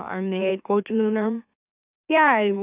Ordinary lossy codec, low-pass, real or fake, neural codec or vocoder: none; 3.6 kHz; fake; autoencoder, 44.1 kHz, a latent of 192 numbers a frame, MeloTTS